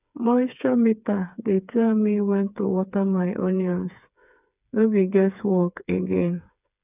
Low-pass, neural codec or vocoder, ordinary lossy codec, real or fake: 3.6 kHz; codec, 16 kHz, 4 kbps, FreqCodec, smaller model; none; fake